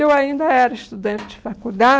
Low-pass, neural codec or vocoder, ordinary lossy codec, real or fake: none; none; none; real